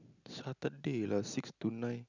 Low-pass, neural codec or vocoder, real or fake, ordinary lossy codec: 7.2 kHz; none; real; none